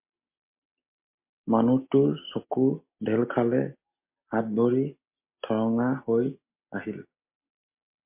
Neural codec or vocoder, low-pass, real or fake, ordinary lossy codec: none; 3.6 kHz; real; MP3, 24 kbps